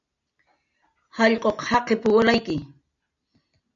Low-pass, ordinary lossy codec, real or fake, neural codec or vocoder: 7.2 kHz; MP3, 96 kbps; real; none